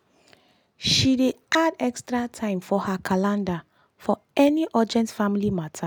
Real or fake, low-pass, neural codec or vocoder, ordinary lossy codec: real; none; none; none